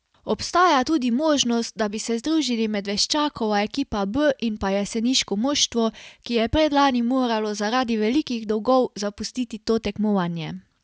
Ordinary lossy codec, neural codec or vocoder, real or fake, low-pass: none; none; real; none